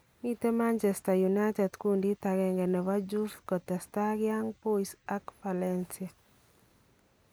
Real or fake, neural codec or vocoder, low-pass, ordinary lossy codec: real; none; none; none